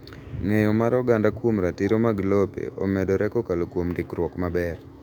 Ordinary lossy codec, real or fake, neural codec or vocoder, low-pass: none; fake; autoencoder, 48 kHz, 128 numbers a frame, DAC-VAE, trained on Japanese speech; 19.8 kHz